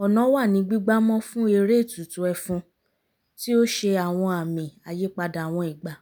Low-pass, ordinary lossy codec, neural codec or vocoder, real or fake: none; none; none; real